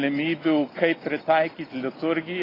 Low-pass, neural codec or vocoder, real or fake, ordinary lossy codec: 5.4 kHz; none; real; AAC, 24 kbps